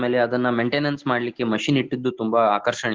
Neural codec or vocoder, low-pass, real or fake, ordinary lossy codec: none; 7.2 kHz; real; Opus, 16 kbps